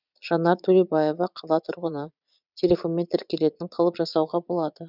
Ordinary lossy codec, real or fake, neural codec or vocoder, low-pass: none; fake; vocoder, 22.05 kHz, 80 mel bands, Vocos; 5.4 kHz